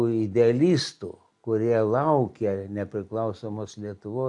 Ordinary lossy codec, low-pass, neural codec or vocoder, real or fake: AAC, 64 kbps; 10.8 kHz; none; real